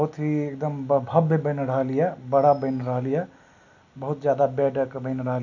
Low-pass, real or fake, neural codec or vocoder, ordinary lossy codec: 7.2 kHz; real; none; none